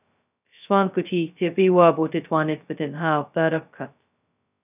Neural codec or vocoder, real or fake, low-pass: codec, 16 kHz, 0.2 kbps, FocalCodec; fake; 3.6 kHz